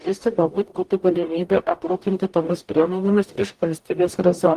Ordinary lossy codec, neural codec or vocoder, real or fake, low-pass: Opus, 24 kbps; codec, 44.1 kHz, 0.9 kbps, DAC; fake; 14.4 kHz